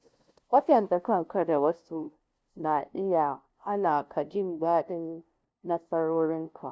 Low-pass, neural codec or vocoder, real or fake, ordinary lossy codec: none; codec, 16 kHz, 0.5 kbps, FunCodec, trained on LibriTTS, 25 frames a second; fake; none